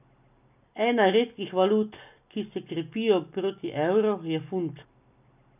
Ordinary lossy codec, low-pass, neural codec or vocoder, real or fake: none; 3.6 kHz; none; real